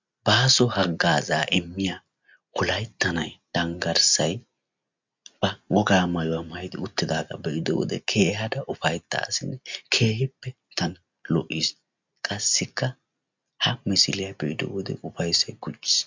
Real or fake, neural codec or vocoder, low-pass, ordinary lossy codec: real; none; 7.2 kHz; MP3, 64 kbps